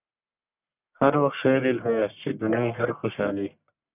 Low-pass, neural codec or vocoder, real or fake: 3.6 kHz; codec, 44.1 kHz, 1.7 kbps, Pupu-Codec; fake